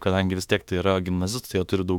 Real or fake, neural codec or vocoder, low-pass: fake; autoencoder, 48 kHz, 32 numbers a frame, DAC-VAE, trained on Japanese speech; 19.8 kHz